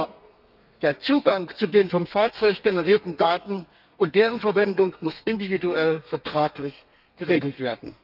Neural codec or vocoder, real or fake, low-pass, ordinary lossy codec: codec, 32 kHz, 1.9 kbps, SNAC; fake; 5.4 kHz; none